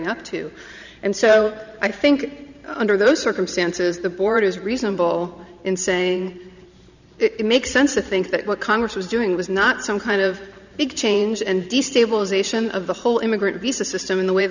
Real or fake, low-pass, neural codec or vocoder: fake; 7.2 kHz; vocoder, 44.1 kHz, 128 mel bands every 512 samples, BigVGAN v2